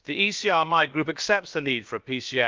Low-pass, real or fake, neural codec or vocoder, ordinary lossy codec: 7.2 kHz; fake; codec, 16 kHz, about 1 kbps, DyCAST, with the encoder's durations; Opus, 24 kbps